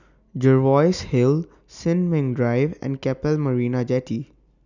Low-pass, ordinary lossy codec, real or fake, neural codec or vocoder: 7.2 kHz; none; real; none